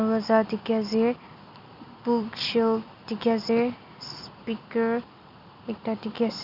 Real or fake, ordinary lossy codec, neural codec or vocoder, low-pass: real; none; none; 5.4 kHz